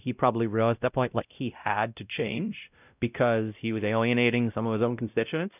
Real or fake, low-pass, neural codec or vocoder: fake; 3.6 kHz; codec, 16 kHz, 0.5 kbps, X-Codec, WavLM features, trained on Multilingual LibriSpeech